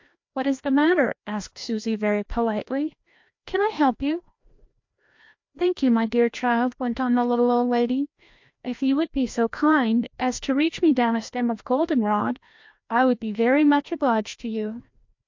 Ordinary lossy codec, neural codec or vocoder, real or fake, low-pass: MP3, 48 kbps; codec, 16 kHz, 1 kbps, FreqCodec, larger model; fake; 7.2 kHz